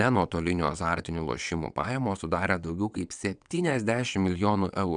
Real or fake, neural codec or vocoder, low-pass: fake; vocoder, 22.05 kHz, 80 mel bands, WaveNeXt; 9.9 kHz